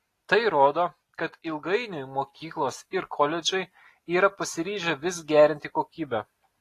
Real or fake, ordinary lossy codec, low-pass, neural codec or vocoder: real; AAC, 48 kbps; 14.4 kHz; none